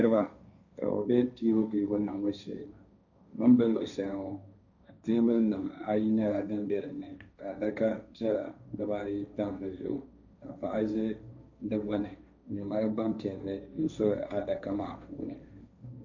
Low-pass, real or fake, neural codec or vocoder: 7.2 kHz; fake; codec, 16 kHz, 1.1 kbps, Voila-Tokenizer